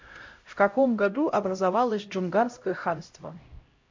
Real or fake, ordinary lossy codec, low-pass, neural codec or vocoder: fake; MP3, 48 kbps; 7.2 kHz; codec, 16 kHz, 0.5 kbps, X-Codec, HuBERT features, trained on LibriSpeech